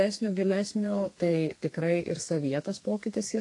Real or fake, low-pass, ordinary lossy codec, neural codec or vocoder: fake; 10.8 kHz; AAC, 48 kbps; codec, 32 kHz, 1.9 kbps, SNAC